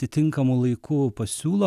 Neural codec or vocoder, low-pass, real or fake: none; 14.4 kHz; real